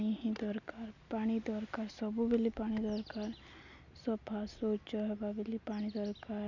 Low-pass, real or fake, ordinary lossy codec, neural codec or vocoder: 7.2 kHz; real; none; none